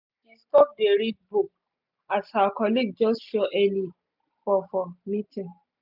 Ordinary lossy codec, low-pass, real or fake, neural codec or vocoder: none; 5.4 kHz; real; none